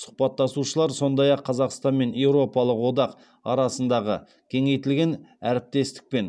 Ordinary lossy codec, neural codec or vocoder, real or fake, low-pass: none; none; real; none